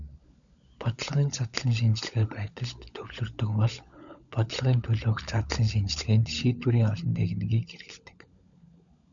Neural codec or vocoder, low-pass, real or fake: codec, 16 kHz, 16 kbps, FunCodec, trained on LibriTTS, 50 frames a second; 7.2 kHz; fake